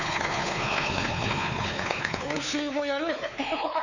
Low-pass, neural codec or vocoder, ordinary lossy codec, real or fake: 7.2 kHz; codec, 16 kHz, 4 kbps, X-Codec, WavLM features, trained on Multilingual LibriSpeech; none; fake